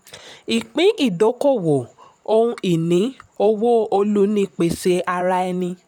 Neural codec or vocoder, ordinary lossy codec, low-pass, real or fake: vocoder, 44.1 kHz, 128 mel bands, Pupu-Vocoder; none; 19.8 kHz; fake